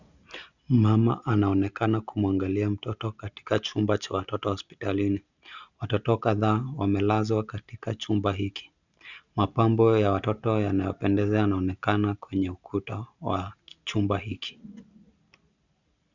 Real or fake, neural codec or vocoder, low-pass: real; none; 7.2 kHz